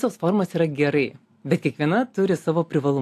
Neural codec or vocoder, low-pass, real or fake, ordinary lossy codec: none; 14.4 kHz; real; AAC, 64 kbps